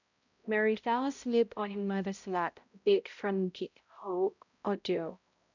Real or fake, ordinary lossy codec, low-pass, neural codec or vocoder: fake; none; 7.2 kHz; codec, 16 kHz, 0.5 kbps, X-Codec, HuBERT features, trained on balanced general audio